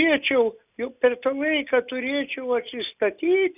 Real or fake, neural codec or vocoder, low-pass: real; none; 3.6 kHz